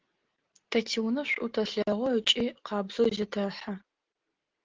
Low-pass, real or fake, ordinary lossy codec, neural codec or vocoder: 7.2 kHz; real; Opus, 16 kbps; none